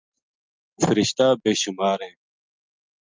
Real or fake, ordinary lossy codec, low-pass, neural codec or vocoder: real; Opus, 24 kbps; 7.2 kHz; none